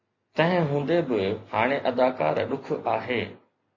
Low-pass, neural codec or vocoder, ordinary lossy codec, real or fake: 7.2 kHz; none; MP3, 32 kbps; real